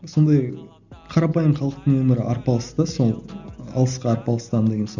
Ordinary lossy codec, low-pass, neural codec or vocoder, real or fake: none; 7.2 kHz; none; real